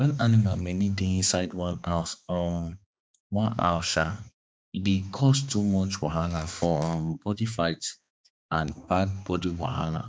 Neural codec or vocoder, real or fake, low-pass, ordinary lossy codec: codec, 16 kHz, 2 kbps, X-Codec, HuBERT features, trained on balanced general audio; fake; none; none